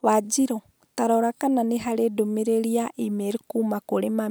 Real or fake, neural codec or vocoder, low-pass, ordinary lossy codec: real; none; none; none